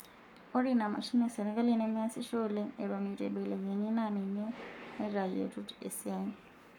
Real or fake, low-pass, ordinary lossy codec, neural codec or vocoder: fake; 19.8 kHz; none; codec, 44.1 kHz, 7.8 kbps, Pupu-Codec